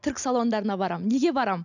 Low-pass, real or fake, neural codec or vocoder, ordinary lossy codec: 7.2 kHz; real; none; none